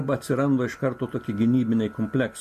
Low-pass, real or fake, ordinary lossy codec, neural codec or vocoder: 14.4 kHz; real; MP3, 64 kbps; none